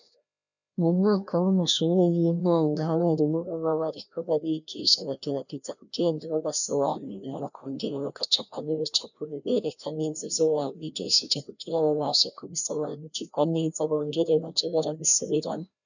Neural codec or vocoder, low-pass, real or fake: codec, 16 kHz, 1 kbps, FreqCodec, larger model; 7.2 kHz; fake